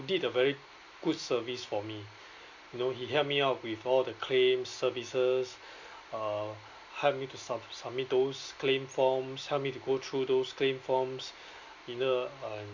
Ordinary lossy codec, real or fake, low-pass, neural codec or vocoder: Opus, 64 kbps; real; 7.2 kHz; none